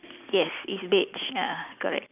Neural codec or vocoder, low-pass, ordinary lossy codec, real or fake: none; 3.6 kHz; none; real